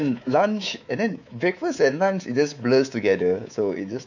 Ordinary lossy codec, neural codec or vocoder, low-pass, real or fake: none; codec, 24 kHz, 3.1 kbps, DualCodec; 7.2 kHz; fake